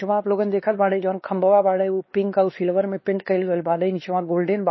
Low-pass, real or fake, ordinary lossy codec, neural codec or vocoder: 7.2 kHz; fake; MP3, 24 kbps; codec, 16 kHz, 2 kbps, X-Codec, WavLM features, trained on Multilingual LibriSpeech